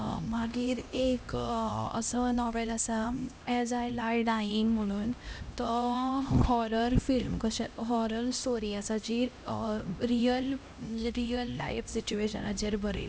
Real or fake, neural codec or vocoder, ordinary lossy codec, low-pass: fake; codec, 16 kHz, 1 kbps, X-Codec, HuBERT features, trained on LibriSpeech; none; none